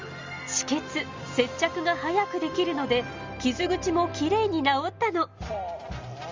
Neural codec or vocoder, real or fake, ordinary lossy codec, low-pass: none; real; Opus, 32 kbps; 7.2 kHz